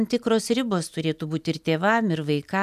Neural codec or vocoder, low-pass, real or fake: none; 14.4 kHz; real